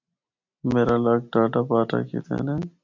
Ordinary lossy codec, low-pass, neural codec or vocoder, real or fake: MP3, 64 kbps; 7.2 kHz; none; real